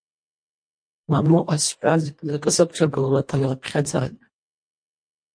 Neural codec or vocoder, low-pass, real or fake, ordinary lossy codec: codec, 24 kHz, 1.5 kbps, HILCodec; 9.9 kHz; fake; MP3, 48 kbps